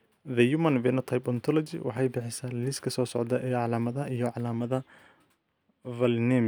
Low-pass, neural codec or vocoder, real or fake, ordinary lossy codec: none; none; real; none